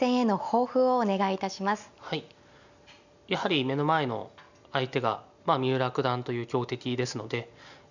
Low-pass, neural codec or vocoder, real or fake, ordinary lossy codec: 7.2 kHz; none; real; none